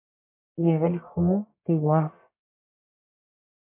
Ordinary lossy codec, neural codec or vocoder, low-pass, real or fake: MP3, 32 kbps; codec, 44.1 kHz, 1.7 kbps, Pupu-Codec; 3.6 kHz; fake